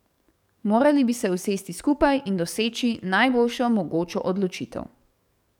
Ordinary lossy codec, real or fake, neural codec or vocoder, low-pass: none; fake; codec, 44.1 kHz, 7.8 kbps, DAC; 19.8 kHz